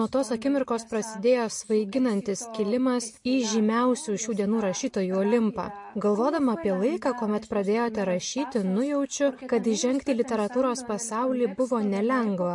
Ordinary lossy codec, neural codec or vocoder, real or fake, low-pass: MP3, 48 kbps; none; real; 10.8 kHz